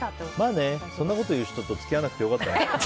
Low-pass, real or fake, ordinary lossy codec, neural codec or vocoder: none; real; none; none